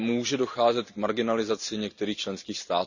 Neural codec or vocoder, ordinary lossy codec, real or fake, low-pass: none; none; real; 7.2 kHz